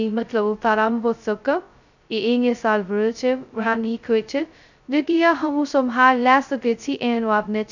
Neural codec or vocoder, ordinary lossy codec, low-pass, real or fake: codec, 16 kHz, 0.2 kbps, FocalCodec; none; 7.2 kHz; fake